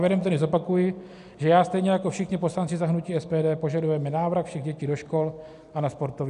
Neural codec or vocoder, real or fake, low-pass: none; real; 10.8 kHz